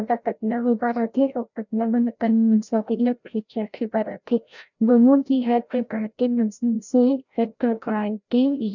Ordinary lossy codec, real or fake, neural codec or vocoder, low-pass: none; fake; codec, 16 kHz, 0.5 kbps, FreqCodec, larger model; 7.2 kHz